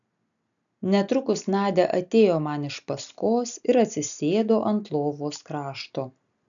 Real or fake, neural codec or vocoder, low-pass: real; none; 7.2 kHz